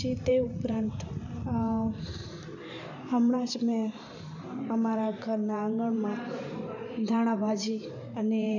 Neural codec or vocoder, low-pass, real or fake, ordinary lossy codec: vocoder, 44.1 kHz, 128 mel bands every 512 samples, BigVGAN v2; 7.2 kHz; fake; none